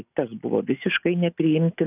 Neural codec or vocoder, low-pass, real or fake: none; 3.6 kHz; real